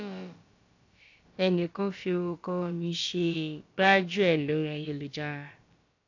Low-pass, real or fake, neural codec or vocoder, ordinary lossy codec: 7.2 kHz; fake; codec, 16 kHz, about 1 kbps, DyCAST, with the encoder's durations; AAC, 48 kbps